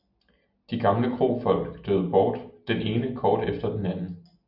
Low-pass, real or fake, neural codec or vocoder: 5.4 kHz; real; none